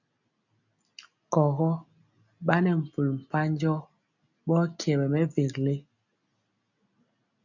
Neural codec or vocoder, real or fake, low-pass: none; real; 7.2 kHz